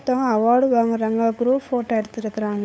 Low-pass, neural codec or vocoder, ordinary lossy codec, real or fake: none; codec, 16 kHz, 8 kbps, FunCodec, trained on LibriTTS, 25 frames a second; none; fake